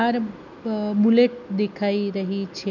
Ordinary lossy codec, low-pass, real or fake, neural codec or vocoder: none; 7.2 kHz; real; none